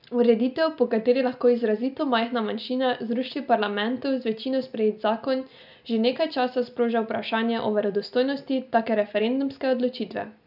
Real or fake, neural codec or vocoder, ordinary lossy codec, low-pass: real; none; none; 5.4 kHz